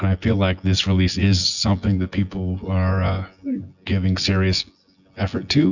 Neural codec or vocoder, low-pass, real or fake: vocoder, 24 kHz, 100 mel bands, Vocos; 7.2 kHz; fake